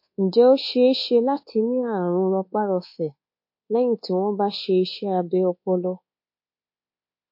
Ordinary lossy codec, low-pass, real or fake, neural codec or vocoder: MP3, 32 kbps; 5.4 kHz; fake; codec, 24 kHz, 1.2 kbps, DualCodec